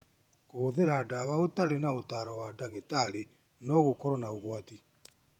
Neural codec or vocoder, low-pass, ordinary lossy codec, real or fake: vocoder, 48 kHz, 128 mel bands, Vocos; 19.8 kHz; none; fake